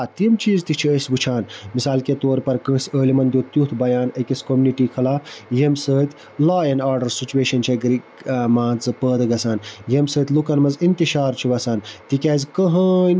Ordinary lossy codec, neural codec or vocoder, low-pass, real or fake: none; none; none; real